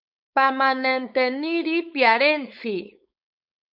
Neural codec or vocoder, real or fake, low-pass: codec, 16 kHz, 4.8 kbps, FACodec; fake; 5.4 kHz